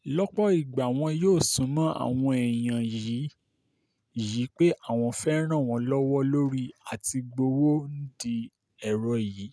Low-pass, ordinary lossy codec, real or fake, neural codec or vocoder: none; none; real; none